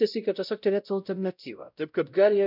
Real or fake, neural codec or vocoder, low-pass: fake; codec, 16 kHz, 0.5 kbps, X-Codec, WavLM features, trained on Multilingual LibriSpeech; 5.4 kHz